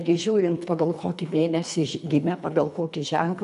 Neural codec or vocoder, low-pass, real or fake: codec, 24 kHz, 3 kbps, HILCodec; 10.8 kHz; fake